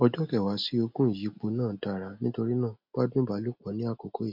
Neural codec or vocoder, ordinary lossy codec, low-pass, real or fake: none; MP3, 48 kbps; 5.4 kHz; real